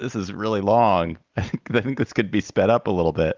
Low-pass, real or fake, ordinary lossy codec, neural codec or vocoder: 7.2 kHz; real; Opus, 32 kbps; none